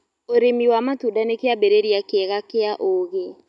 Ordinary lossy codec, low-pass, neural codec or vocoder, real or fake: none; 10.8 kHz; none; real